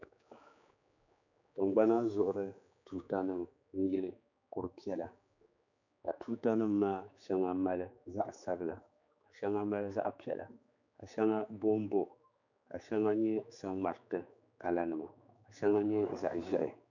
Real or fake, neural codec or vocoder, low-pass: fake; codec, 16 kHz, 4 kbps, X-Codec, HuBERT features, trained on general audio; 7.2 kHz